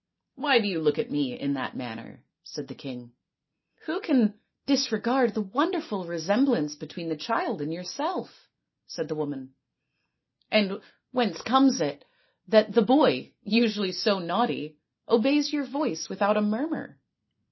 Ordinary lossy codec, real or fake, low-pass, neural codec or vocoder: MP3, 24 kbps; real; 7.2 kHz; none